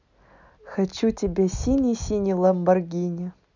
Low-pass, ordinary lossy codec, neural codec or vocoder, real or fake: 7.2 kHz; none; none; real